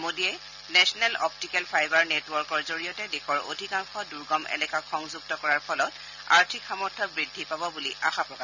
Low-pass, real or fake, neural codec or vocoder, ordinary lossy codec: 7.2 kHz; real; none; none